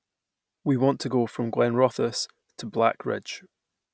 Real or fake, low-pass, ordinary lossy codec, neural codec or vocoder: real; none; none; none